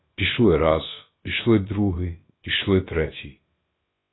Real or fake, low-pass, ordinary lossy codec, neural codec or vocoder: fake; 7.2 kHz; AAC, 16 kbps; codec, 16 kHz, about 1 kbps, DyCAST, with the encoder's durations